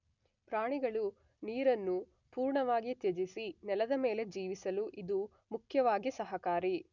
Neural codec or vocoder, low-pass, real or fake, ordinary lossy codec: none; 7.2 kHz; real; none